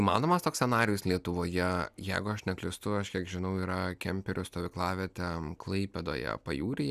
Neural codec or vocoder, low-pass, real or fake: none; 14.4 kHz; real